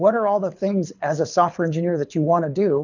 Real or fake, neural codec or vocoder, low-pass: fake; codec, 24 kHz, 6 kbps, HILCodec; 7.2 kHz